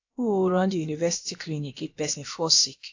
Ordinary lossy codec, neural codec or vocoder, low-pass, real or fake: AAC, 48 kbps; codec, 16 kHz, about 1 kbps, DyCAST, with the encoder's durations; 7.2 kHz; fake